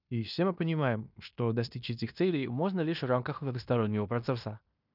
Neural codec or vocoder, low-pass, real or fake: codec, 16 kHz in and 24 kHz out, 0.9 kbps, LongCat-Audio-Codec, fine tuned four codebook decoder; 5.4 kHz; fake